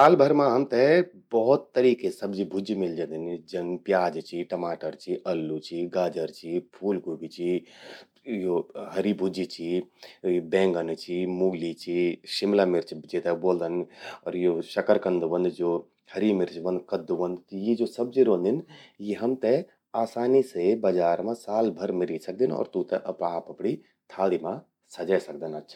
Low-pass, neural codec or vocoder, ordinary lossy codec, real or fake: 19.8 kHz; none; MP3, 96 kbps; real